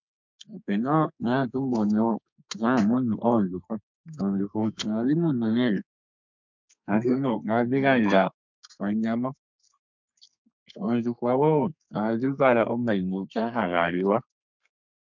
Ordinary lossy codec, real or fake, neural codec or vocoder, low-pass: MP3, 64 kbps; fake; codec, 32 kHz, 1.9 kbps, SNAC; 7.2 kHz